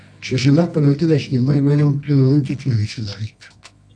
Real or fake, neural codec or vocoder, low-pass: fake; codec, 24 kHz, 0.9 kbps, WavTokenizer, medium music audio release; 9.9 kHz